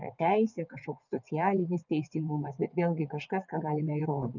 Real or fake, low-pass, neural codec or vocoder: fake; 7.2 kHz; vocoder, 22.05 kHz, 80 mel bands, WaveNeXt